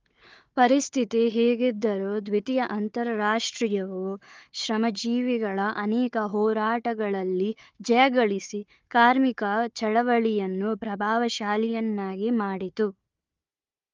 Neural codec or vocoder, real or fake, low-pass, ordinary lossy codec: codec, 16 kHz, 4 kbps, FunCodec, trained on Chinese and English, 50 frames a second; fake; 7.2 kHz; Opus, 32 kbps